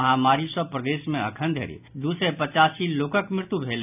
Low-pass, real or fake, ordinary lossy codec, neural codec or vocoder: 3.6 kHz; real; none; none